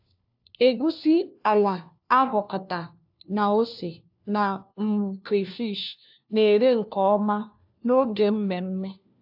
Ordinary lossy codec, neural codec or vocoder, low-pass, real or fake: AAC, 48 kbps; codec, 16 kHz, 1 kbps, FunCodec, trained on LibriTTS, 50 frames a second; 5.4 kHz; fake